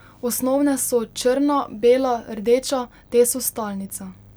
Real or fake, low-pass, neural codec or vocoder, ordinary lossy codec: real; none; none; none